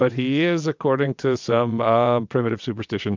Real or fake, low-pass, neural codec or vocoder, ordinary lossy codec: fake; 7.2 kHz; vocoder, 22.05 kHz, 80 mel bands, WaveNeXt; MP3, 64 kbps